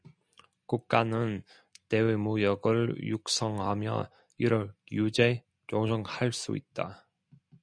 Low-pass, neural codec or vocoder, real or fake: 9.9 kHz; none; real